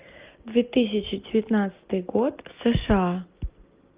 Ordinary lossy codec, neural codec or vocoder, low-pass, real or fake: Opus, 24 kbps; none; 3.6 kHz; real